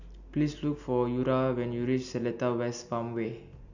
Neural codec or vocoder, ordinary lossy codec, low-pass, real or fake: none; none; 7.2 kHz; real